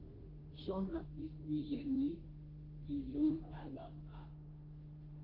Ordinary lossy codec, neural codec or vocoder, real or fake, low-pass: Opus, 16 kbps; codec, 16 kHz, 0.5 kbps, FunCodec, trained on Chinese and English, 25 frames a second; fake; 5.4 kHz